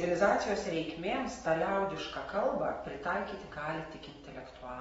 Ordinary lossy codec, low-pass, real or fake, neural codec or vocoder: AAC, 24 kbps; 10.8 kHz; real; none